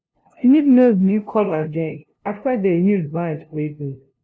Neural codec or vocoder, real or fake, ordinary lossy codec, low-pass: codec, 16 kHz, 0.5 kbps, FunCodec, trained on LibriTTS, 25 frames a second; fake; none; none